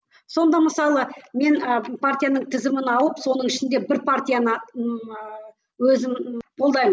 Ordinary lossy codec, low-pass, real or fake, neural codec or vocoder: none; none; real; none